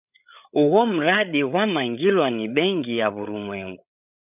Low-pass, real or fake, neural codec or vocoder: 3.6 kHz; fake; codec, 16 kHz, 8 kbps, FreqCodec, larger model